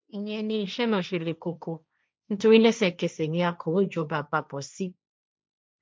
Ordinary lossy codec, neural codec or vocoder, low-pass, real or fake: none; codec, 16 kHz, 1.1 kbps, Voila-Tokenizer; none; fake